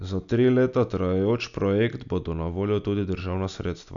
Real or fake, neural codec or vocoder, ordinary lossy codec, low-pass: real; none; none; 7.2 kHz